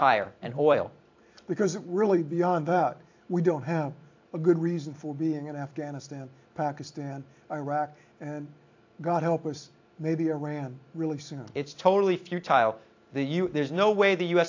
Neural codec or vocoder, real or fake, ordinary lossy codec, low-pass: vocoder, 44.1 kHz, 128 mel bands every 256 samples, BigVGAN v2; fake; AAC, 48 kbps; 7.2 kHz